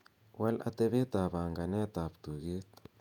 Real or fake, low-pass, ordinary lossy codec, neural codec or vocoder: fake; 19.8 kHz; none; vocoder, 48 kHz, 128 mel bands, Vocos